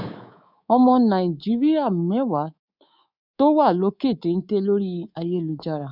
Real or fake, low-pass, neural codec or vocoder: fake; 5.4 kHz; codec, 24 kHz, 3.1 kbps, DualCodec